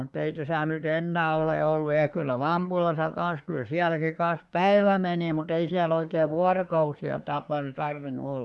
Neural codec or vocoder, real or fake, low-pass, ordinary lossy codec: codec, 24 kHz, 1 kbps, SNAC; fake; none; none